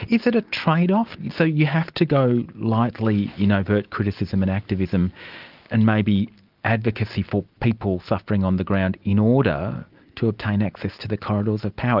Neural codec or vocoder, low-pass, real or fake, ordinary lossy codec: none; 5.4 kHz; real; Opus, 24 kbps